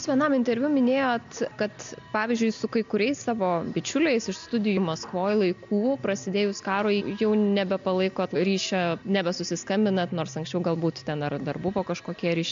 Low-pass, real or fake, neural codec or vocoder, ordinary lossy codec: 7.2 kHz; real; none; AAC, 64 kbps